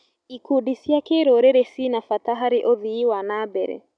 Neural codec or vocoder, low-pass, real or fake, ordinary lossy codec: none; 9.9 kHz; real; none